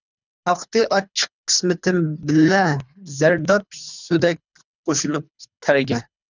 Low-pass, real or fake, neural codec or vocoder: 7.2 kHz; fake; codec, 24 kHz, 3 kbps, HILCodec